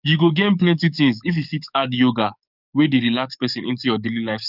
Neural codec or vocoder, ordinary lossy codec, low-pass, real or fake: codec, 44.1 kHz, 7.8 kbps, DAC; none; 5.4 kHz; fake